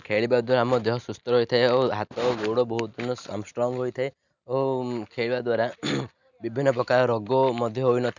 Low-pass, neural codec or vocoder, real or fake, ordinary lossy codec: 7.2 kHz; none; real; none